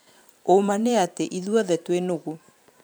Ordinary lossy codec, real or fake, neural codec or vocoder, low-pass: none; fake; vocoder, 44.1 kHz, 128 mel bands every 512 samples, BigVGAN v2; none